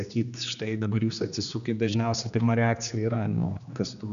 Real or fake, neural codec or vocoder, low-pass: fake; codec, 16 kHz, 2 kbps, X-Codec, HuBERT features, trained on general audio; 7.2 kHz